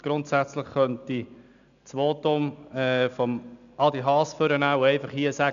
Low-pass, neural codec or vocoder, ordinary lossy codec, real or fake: 7.2 kHz; none; none; real